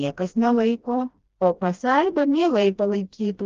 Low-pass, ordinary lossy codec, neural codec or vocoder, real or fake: 7.2 kHz; Opus, 32 kbps; codec, 16 kHz, 1 kbps, FreqCodec, smaller model; fake